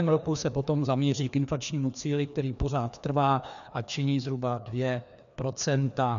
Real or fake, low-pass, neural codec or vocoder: fake; 7.2 kHz; codec, 16 kHz, 2 kbps, FreqCodec, larger model